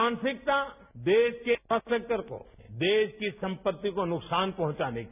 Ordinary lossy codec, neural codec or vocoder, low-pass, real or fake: none; none; 3.6 kHz; real